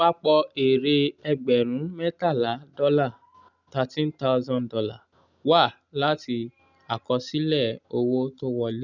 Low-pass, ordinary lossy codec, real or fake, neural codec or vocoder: 7.2 kHz; none; real; none